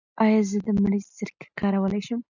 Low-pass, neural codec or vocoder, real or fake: 7.2 kHz; none; real